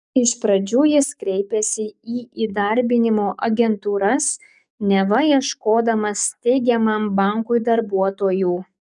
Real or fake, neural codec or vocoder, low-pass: fake; codec, 44.1 kHz, 7.8 kbps, DAC; 10.8 kHz